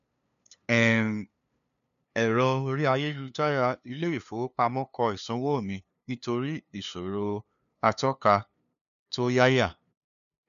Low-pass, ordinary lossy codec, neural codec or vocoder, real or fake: 7.2 kHz; none; codec, 16 kHz, 2 kbps, FunCodec, trained on LibriTTS, 25 frames a second; fake